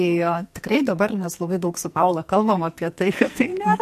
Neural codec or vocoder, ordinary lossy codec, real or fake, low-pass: codec, 44.1 kHz, 2.6 kbps, SNAC; MP3, 64 kbps; fake; 14.4 kHz